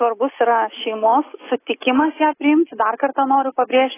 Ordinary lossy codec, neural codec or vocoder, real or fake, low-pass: AAC, 16 kbps; none; real; 3.6 kHz